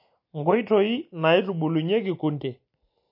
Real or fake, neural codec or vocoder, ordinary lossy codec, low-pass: real; none; MP3, 32 kbps; 5.4 kHz